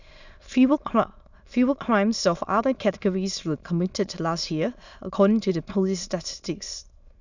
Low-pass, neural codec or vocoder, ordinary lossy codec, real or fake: 7.2 kHz; autoencoder, 22.05 kHz, a latent of 192 numbers a frame, VITS, trained on many speakers; none; fake